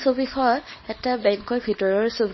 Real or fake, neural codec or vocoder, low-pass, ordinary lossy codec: fake; codec, 24 kHz, 0.9 kbps, WavTokenizer, small release; 7.2 kHz; MP3, 24 kbps